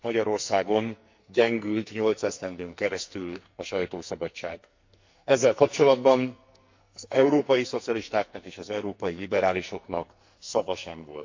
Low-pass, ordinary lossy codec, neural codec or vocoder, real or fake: 7.2 kHz; MP3, 64 kbps; codec, 44.1 kHz, 2.6 kbps, SNAC; fake